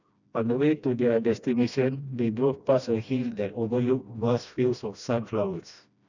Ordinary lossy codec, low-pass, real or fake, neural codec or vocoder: none; 7.2 kHz; fake; codec, 16 kHz, 1 kbps, FreqCodec, smaller model